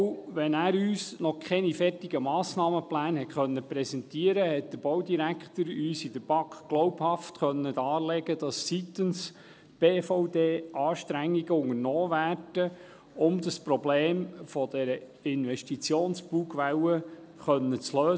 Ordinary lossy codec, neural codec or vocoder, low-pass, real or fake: none; none; none; real